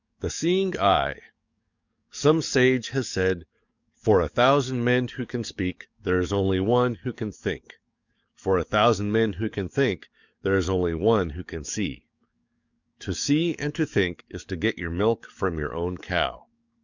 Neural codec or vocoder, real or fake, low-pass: codec, 44.1 kHz, 7.8 kbps, DAC; fake; 7.2 kHz